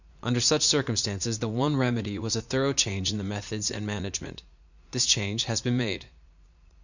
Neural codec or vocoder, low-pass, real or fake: vocoder, 44.1 kHz, 80 mel bands, Vocos; 7.2 kHz; fake